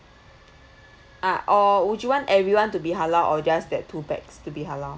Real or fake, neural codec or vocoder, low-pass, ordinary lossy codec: real; none; none; none